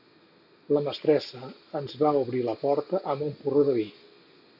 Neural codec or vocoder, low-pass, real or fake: none; 5.4 kHz; real